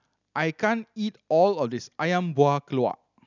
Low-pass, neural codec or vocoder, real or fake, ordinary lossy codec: 7.2 kHz; none; real; none